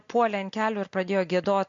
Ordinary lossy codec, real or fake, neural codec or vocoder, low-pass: MP3, 48 kbps; real; none; 7.2 kHz